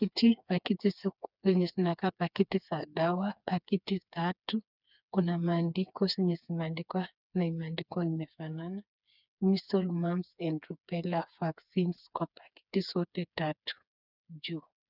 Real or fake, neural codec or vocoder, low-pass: fake; codec, 16 kHz, 4 kbps, FreqCodec, smaller model; 5.4 kHz